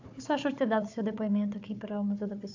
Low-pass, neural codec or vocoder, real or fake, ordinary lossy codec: 7.2 kHz; codec, 16 kHz, 4 kbps, FunCodec, trained on Chinese and English, 50 frames a second; fake; AAC, 48 kbps